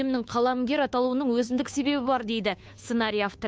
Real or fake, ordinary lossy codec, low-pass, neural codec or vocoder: fake; none; none; codec, 16 kHz, 2 kbps, FunCodec, trained on Chinese and English, 25 frames a second